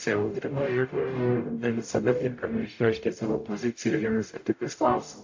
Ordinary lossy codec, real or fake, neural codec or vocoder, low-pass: AAC, 48 kbps; fake; codec, 44.1 kHz, 0.9 kbps, DAC; 7.2 kHz